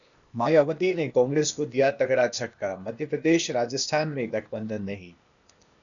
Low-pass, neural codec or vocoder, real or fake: 7.2 kHz; codec, 16 kHz, 0.8 kbps, ZipCodec; fake